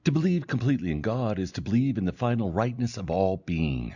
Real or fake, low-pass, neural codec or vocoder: real; 7.2 kHz; none